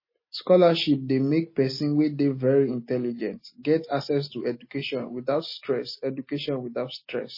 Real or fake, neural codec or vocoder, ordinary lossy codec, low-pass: real; none; MP3, 24 kbps; 5.4 kHz